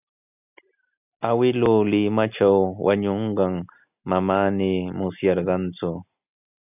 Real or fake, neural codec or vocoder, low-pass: real; none; 3.6 kHz